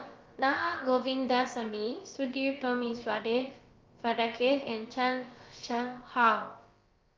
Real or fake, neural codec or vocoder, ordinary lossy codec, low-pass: fake; codec, 16 kHz, about 1 kbps, DyCAST, with the encoder's durations; Opus, 32 kbps; 7.2 kHz